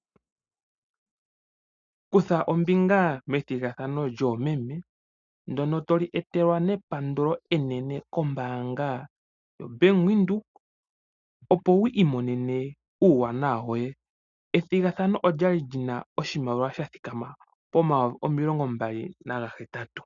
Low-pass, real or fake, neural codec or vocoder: 7.2 kHz; real; none